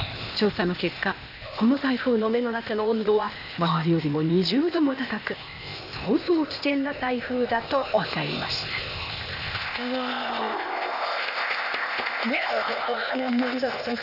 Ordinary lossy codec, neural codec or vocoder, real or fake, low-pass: none; codec, 16 kHz, 0.8 kbps, ZipCodec; fake; 5.4 kHz